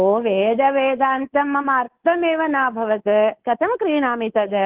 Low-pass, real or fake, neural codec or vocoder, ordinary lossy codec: 3.6 kHz; fake; codec, 24 kHz, 3.1 kbps, DualCodec; Opus, 16 kbps